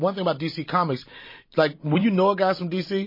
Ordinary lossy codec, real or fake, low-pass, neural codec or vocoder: MP3, 24 kbps; fake; 5.4 kHz; vocoder, 44.1 kHz, 128 mel bands every 256 samples, BigVGAN v2